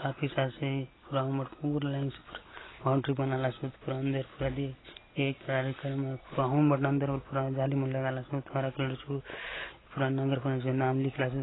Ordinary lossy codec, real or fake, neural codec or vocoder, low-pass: AAC, 16 kbps; real; none; 7.2 kHz